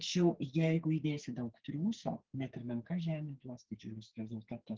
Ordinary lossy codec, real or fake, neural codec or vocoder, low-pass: Opus, 16 kbps; fake; codec, 44.1 kHz, 2.6 kbps, SNAC; 7.2 kHz